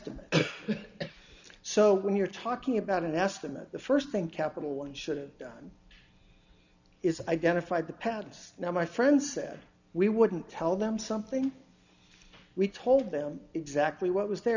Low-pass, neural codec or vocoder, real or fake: 7.2 kHz; none; real